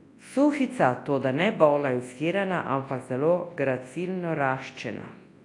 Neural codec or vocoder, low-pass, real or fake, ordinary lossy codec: codec, 24 kHz, 0.9 kbps, WavTokenizer, large speech release; 10.8 kHz; fake; AAC, 48 kbps